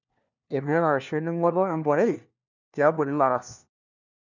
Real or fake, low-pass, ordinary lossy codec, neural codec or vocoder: fake; 7.2 kHz; none; codec, 16 kHz, 1 kbps, FunCodec, trained on LibriTTS, 50 frames a second